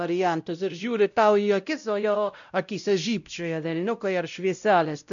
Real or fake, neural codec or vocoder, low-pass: fake; codec, 16 kHz, 0.5 kbps, X-Codec, WavLM features, trained on Multilingual LibriSpeech; 7.2 kHz